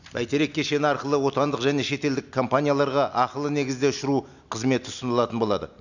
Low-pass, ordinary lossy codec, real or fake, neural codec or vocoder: 7.2 kHz; none; real; none